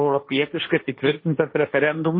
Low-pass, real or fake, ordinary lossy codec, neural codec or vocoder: 5.4 kHz; fake; MP3, 24 kbps; codec, 16 kHz, 1.1 kbps, Voila-Tokenizer